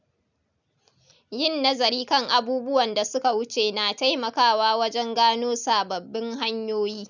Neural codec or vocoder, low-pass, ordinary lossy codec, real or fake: none; 7.2 kHz; none; real